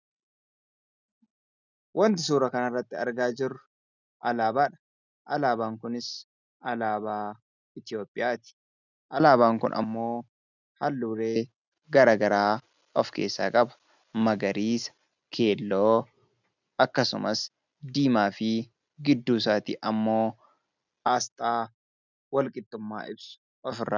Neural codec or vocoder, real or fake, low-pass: none; real; 7.2 kHz